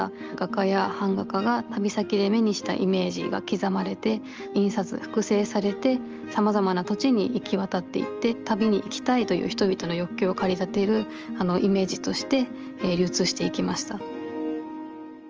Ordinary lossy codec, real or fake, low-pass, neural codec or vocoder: Opus, 24 kbps; real; 7.2 kHz; none